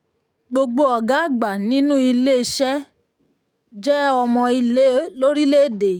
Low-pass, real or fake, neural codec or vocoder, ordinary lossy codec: 19.8 kHz; fake; codec, 44.1 kHz, 7.8 kbps, DAC; none